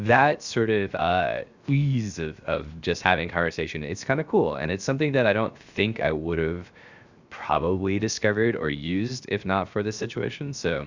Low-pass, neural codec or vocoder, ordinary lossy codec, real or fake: 7.2 kHz; codec, 16 kHz, 0.7 kbps, FocalCodec; Opus, 64 kbps; fake